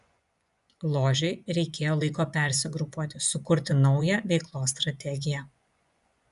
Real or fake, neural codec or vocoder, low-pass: fake; vocoder, 24 kHz, 100 mel bands, Vocos; 10.8 kHz